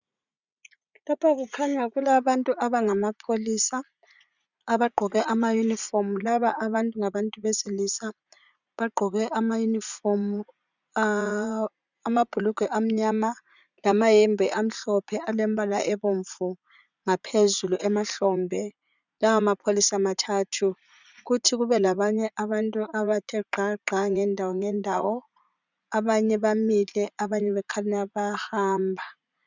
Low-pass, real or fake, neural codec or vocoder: 7.2 kHz; fake; vocoder, 44.1 kHz, 80 mel bands, Vocos